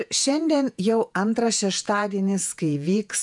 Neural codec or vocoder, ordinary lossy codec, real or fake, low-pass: vocoder, 48 kHz, 128 mel bands, Vocos; MP3, 96 kbps; fake; 10.8 kHz